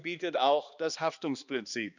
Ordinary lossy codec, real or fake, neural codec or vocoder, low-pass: none; fake; codec, 16 kHz, 2 kbps, X-Codec, HuBERT features, trained on balanced general audio; 7.2 kHz